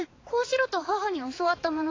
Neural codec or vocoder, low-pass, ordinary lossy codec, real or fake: codec, 16 kHz, 4 kbps, X-Codec, HuBERT features, trained on balanced general audio; 7.2 kHz; AAC, 32 kbps; fake